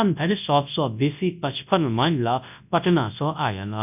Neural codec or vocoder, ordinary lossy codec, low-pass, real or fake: codec, 24 kHz, 0.9 kbps, WavTokenizer, large speech release; none; 3.6 kHz; fake